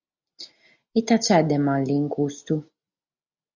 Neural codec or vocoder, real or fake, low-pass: none; real; 7.2 kHz